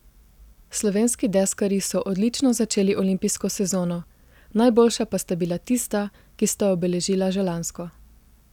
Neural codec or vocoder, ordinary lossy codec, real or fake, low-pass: none; none; real; 19.8 kHz